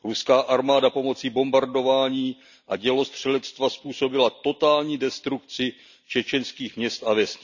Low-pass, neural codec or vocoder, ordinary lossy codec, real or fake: 7.2 kHz; none; none; real